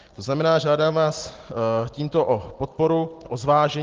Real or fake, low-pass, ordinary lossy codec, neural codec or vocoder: real; 7.2 kHz; Opus, 16 kbps; none